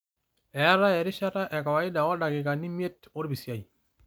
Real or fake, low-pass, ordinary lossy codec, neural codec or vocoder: real; none; none; none